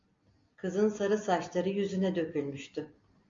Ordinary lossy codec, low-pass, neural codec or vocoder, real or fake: MP3, 48 kbps; 7.2 kHz; none; real